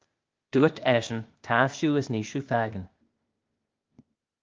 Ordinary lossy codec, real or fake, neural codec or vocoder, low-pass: Opus, 24 kbps; fake; codec, 16 kHz, 0.8 kbps, ZipCodec; 7.2 kHz